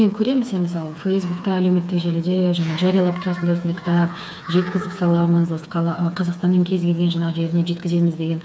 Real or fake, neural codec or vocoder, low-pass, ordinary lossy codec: fake; codec, 16 kHz, 4 kbps, FreqCodec, smaller model; none; none